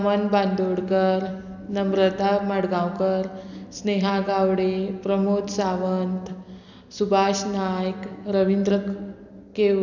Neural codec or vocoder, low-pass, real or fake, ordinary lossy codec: none; 7.2 kHz; real; none